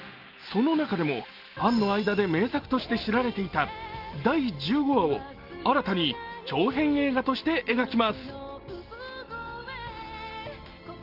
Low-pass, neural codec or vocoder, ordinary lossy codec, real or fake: 5.4 kHz; none; Opus, 32 kbps; real